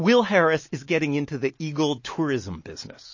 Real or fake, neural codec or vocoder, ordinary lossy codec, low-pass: real; none; MP3, 32 kbps; 7.2 kHz